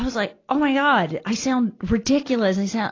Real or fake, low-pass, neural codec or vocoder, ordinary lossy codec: real; 7.2 kHz; none; AAC, 32 kbps